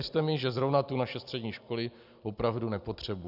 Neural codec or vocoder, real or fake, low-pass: none; real; 5.4 kHz